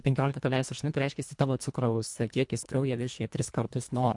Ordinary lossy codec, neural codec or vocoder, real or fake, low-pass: MP3, 64 kbps; codec, 24 kHz, 1.5 kbps, HILCodec; fake; 10.8 kHz